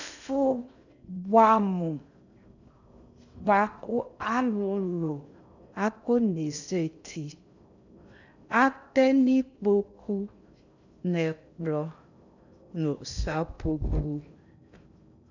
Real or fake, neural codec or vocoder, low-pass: fake; codec, 16 kHz in and 24 kHz out, 0.6 kbps, FocalCodec, streaming, 2048 codes; 7.2 kHz